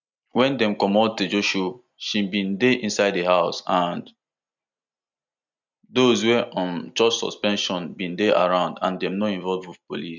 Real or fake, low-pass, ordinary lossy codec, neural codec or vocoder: real; 7.2 kHz; none; none